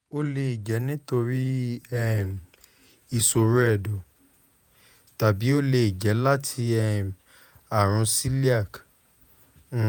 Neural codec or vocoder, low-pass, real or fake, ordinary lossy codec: vocoder, 48 kHz, 128 mel bands, Vocos; none; fake; none